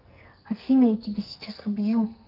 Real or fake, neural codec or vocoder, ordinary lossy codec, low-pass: fake; codec, 44.1 kHz, 2.6 kbps, SNAC; Opus, 24 kbps; 5.4 kHz